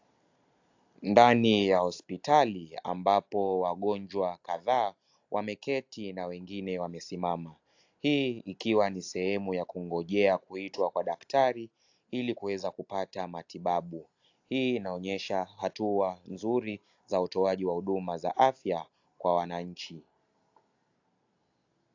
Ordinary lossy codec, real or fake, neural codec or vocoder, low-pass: AAC, 48 kbps; real; none; 7.2 kHz